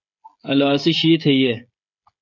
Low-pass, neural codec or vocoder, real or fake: 7.2 kHz; codec, 16 kHz, 16 kbps, FreqCodec, smaller model; fake